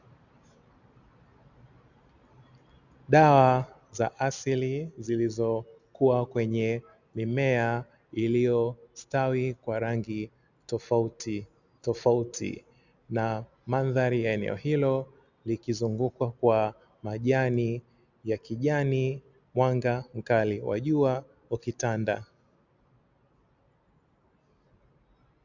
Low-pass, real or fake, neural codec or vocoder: 7.2 kHz; real; none